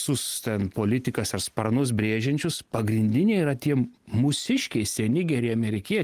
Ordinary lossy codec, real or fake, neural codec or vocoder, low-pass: Opus, 24 kbps; real; none; 14.4 kHz